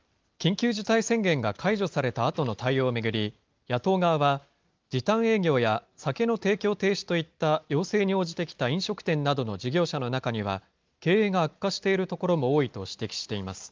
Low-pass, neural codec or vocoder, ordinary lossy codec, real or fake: 7.2 kHz; none; Opus, 32 kbps; real